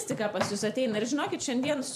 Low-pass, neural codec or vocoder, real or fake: 14.4 kHz; vocoder, 44.1 kHz, 128 mel bands every 512 samples, BigVGAN v2; fake